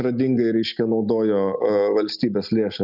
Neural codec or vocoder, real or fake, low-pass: none; real; 5.4 kHz